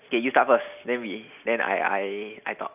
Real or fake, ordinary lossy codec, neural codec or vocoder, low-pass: real; none; none; 3.6 kHz